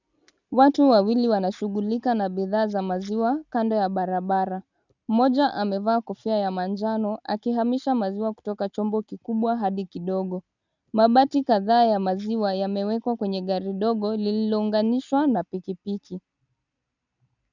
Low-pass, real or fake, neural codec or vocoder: 7.2 kHz; real; none